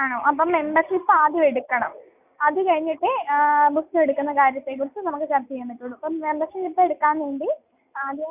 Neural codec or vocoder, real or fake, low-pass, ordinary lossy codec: none; real; 3.6 kHz; none